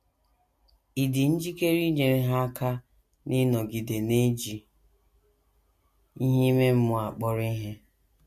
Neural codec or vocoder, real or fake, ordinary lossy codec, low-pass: none; real; MP3, 64 kbps; 14.4 kHz